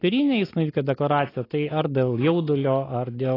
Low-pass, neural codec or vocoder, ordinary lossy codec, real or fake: 5.4 kHz; none; AAC, 24 kbps; real